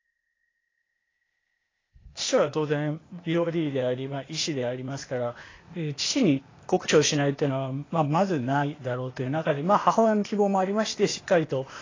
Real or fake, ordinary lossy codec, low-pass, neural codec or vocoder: fake; AAC, 32 kbps; 7.2 kHz; codec, 16 kHz, 0.8 kbps, ZipCodec